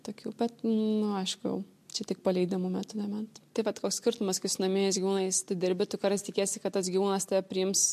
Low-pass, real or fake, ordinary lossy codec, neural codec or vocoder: 14.4 kHz; real; MP3, 64 kbps; none